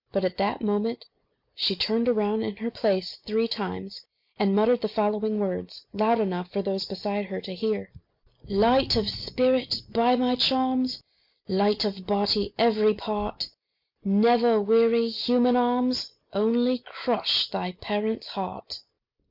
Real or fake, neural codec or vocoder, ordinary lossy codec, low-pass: real; none; MP3, 48 kbps; 5.4 kHz